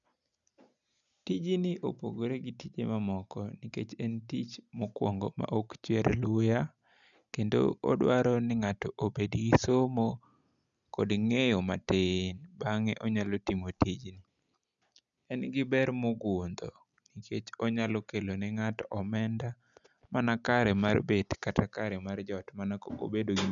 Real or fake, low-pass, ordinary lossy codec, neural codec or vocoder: real; 7.2 kHz; none; none